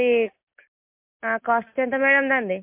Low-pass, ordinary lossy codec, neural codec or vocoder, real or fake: 3.6 kHz; MP3, 32 kbps; none; real